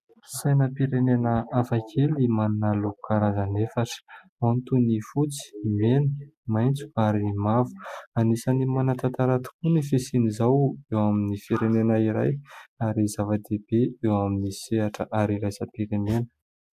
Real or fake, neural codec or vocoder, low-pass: real; none; 14.4 kHz